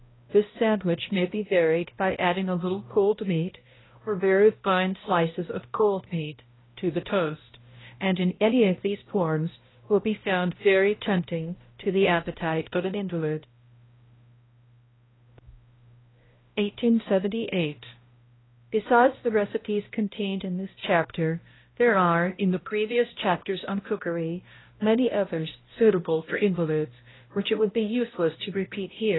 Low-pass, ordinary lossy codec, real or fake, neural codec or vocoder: 7.2 kHz; AAC, 16 kbps; fake; codec, 16 kHz, 0.5 kbps, X-Codec, HuBERT features, trained on balanced general audio